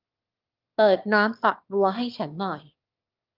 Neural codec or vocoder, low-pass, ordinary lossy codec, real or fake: autoencoder, 22.05 kHz, a latent of 192 numbers a frame, VITS, trained on one speaker; 5.4 kHz; Opus, 32 kbps; fake